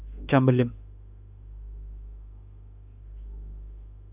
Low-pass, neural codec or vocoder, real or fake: 3.6 kHz; autoencoder, 48 kHz, 32 numbers a frame, DAC-VAE, trained on Japanese speech; fake